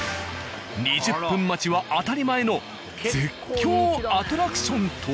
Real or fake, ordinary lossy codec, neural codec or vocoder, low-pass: real; none; none; none